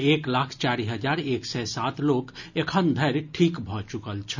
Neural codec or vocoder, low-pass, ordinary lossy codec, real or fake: none; 7.2 kHz; none; real